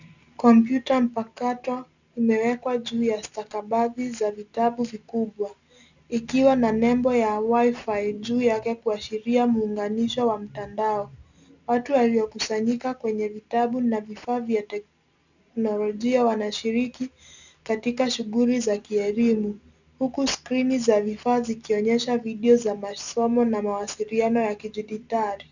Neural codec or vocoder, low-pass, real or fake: none; 7.2 kHz; real